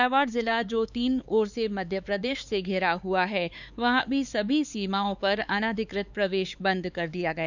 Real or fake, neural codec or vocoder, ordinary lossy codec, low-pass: fake; codec, 16 kHz, 4 kbps, X-Codec, HuBERT features, trained on LibriSpeech; none; 7.2 kHz